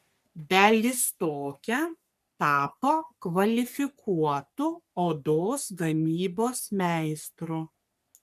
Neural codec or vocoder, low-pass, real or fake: codec, 44.1 kHz, 3.4 kbps, Pupu-Codec; 14.4 kHz; fake